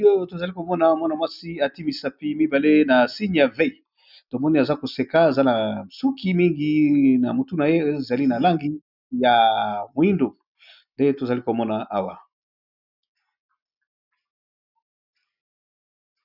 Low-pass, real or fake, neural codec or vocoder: 5.4 kHz; real; none